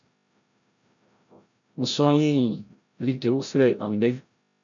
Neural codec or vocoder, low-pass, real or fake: codec, 16 kHz, 0.5 kbps, FreqCodec, larger model; 7.2 kHz; fake